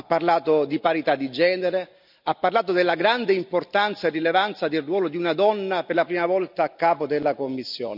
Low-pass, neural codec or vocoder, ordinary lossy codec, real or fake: 5.4 kHz; none; none; real